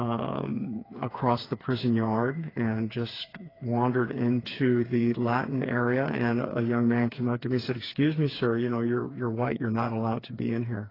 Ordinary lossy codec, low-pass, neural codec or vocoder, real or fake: AAC, 24 kbps; 5.4 kHz; codec, 16 kHz, 4 kbps, FreqCodec, smaller model; fake